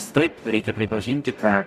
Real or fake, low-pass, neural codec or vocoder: fake; 14.4 kHz; codec, 44.1 kHz, 0.9 kbps, DAC